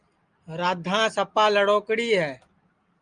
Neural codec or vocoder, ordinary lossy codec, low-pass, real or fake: none; Opus, 32 kbps; 9.9 kHz; real